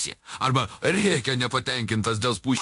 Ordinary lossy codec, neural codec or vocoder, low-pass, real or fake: AAC, 64 kbps; codec, 24 kHz, 0.9 kbps, DualCodec; 10.8 kHz; fake